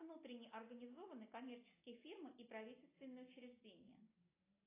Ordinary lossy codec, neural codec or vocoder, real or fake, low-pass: AAC, 16 kbps; none; real; 3.6 kHz